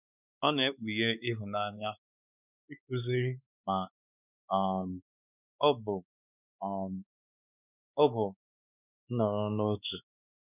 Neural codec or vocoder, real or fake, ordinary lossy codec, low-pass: codec, 16 kHz, 4 kbps, X-Codec, WavLM features, trained on Multilingual LibriSpeech; fake; none; 3.6 kHz